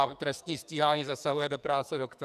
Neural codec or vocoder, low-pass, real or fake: codec, 44.1 kHz, 2.6 kbps, SNAC; 14.4 kHz; fake